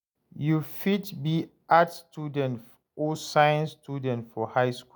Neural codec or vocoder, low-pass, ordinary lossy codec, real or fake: none; none; none; real